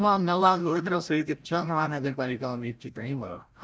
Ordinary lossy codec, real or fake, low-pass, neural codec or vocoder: none; fake; none; codec, 16 kHz, 0.5 kbps, FreqCodec, larger model